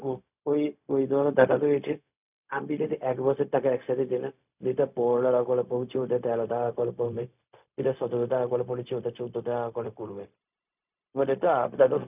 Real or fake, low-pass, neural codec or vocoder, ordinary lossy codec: fake; 3.6 kHz; codec, 16 kHz, 0.4 kbps, LongCat-Audio-Codec; none